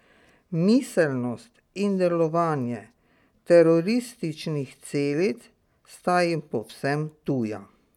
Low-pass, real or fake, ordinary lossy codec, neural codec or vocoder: 19.8 kHz; real; none; none